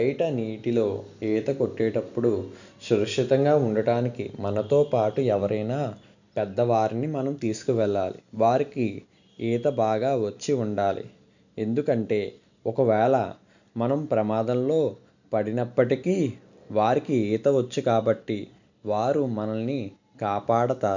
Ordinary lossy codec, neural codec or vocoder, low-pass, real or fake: none; none; 7.2 kHz; real